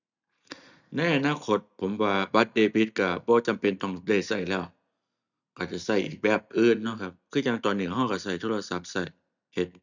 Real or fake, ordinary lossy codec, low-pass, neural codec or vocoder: real; none; 7.2 kHz; none